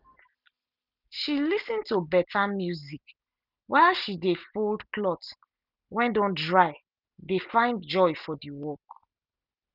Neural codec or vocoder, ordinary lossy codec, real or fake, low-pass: none; none; real; 5.4 kHz